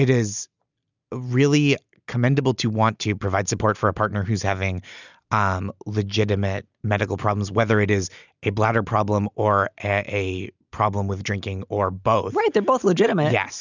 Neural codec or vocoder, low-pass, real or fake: none; 7.2 kHz; real